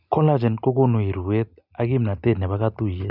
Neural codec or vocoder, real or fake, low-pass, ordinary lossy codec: none; real; 5.4 kHz; none